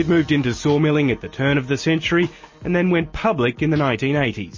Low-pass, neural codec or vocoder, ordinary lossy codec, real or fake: 7.2 kHz; none; MP3, 32 kbps; real